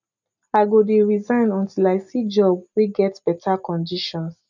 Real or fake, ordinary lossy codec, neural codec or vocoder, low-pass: real; none; none; 7.2 kHz